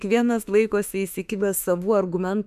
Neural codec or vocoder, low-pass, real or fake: autoencoder, 48 kHz, 32 numbers a frame, DAC-VAE, trained on Japanese speech; 14.4 kHz; fake